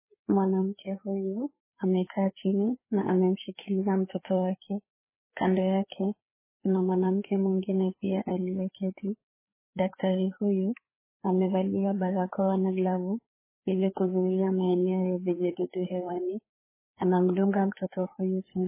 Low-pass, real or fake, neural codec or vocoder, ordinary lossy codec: 3.6 kHz; fake; codec, 16 kHz, 4 kbps, FreqCodec, larger model; MP3, 16 kbps